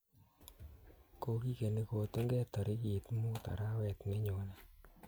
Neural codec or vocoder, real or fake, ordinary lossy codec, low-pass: none; real; none; none